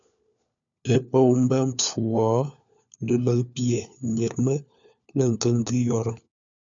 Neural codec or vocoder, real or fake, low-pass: codec, 16 kHz, 4 kbps, FunCodec, trained on LibriTTS, 50 frames a second; fake; 7.2 kHz